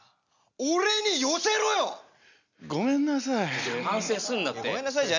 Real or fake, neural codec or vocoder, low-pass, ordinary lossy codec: real; none; 7.2 kHz; none